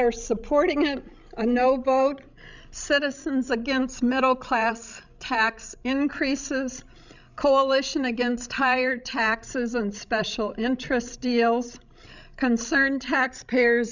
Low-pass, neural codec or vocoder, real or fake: 7.2 kHz; codec, 16 kHz, 16 kbps, FreqCodec, larger model; fake